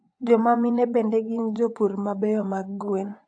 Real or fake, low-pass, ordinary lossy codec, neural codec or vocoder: fake; 9.9 kHz; AAC, 48 kbps; vocoder, 24 kHz, 100 mel bands, Vocos